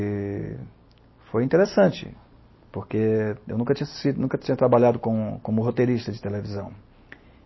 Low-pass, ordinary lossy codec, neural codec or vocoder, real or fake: 7.2 kHz; MP3, 24 kbps; none; real